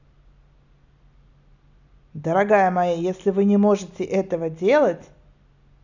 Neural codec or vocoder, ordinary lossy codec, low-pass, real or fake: none; none; 7.2 kHz; real